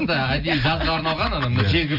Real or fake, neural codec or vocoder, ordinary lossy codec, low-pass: real; none; none; 5.4 kHz